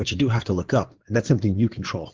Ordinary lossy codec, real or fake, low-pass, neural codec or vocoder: Opus, 16 kbps; fake; 7.2 kHz; codec, 16 kHz in and 24 kHz out, 2.2 kbps, FireRedTTS-2 codec